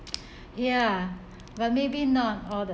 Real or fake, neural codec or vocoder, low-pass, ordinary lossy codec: real; none; none; none